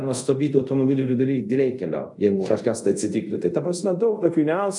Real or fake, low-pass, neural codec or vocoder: fake; 10.8 kHz; codec, 24 kHz, 0.5 kbps, DualCodec